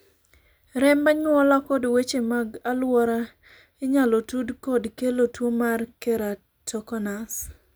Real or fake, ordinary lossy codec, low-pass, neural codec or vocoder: real; none; none; none